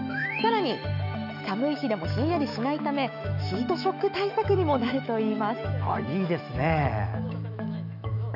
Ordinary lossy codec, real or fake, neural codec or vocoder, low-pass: none; fake; autoencoder, 48 kHz, 128 numbers a frame, DAC-VAE, trained on Japanese speech; 5.4 kHz